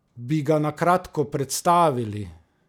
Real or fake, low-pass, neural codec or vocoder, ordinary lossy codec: real; 19.8 kHz; none; none